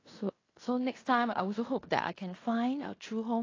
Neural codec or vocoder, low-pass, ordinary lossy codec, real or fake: codec, 16 kHz in and 24 kHz out, 0.9 kbps, LongCat-Audio-Codec, fine tuned four codebook decoder; 7.2 kHz; AAC, 32 kbps; fake